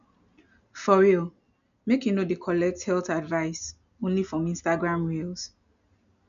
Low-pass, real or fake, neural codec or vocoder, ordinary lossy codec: 7.2 kHz; real; none; none